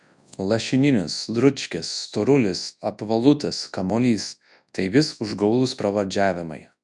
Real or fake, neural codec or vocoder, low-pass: fake; codec, 24 kHz, 0.9 kbps, WavTokenizer, large speech release; 10.8 kHz